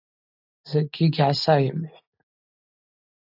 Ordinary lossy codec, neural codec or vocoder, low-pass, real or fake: AAC, 48 kbps; none; 5.4 kHz; real